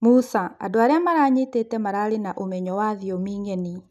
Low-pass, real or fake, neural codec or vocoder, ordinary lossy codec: 14.4 kHz; real; none; none